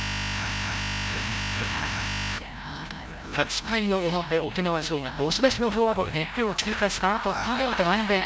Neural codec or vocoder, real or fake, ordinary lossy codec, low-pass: codec, 16 kHz, 0.5 kbps, FreqCodec, larger model; fake; none; none